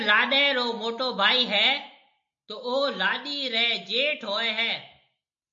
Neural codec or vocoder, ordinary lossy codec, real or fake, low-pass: none; MP3, 64 kbps; real; 7.2 kHz